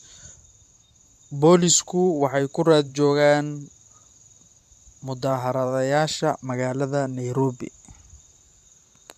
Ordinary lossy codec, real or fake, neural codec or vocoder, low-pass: AAC, 96 kbps; fake; vocoder, 44.1 kHz, 128 mel bands every 512 samples, BigVGAN v2; 14.4 kHz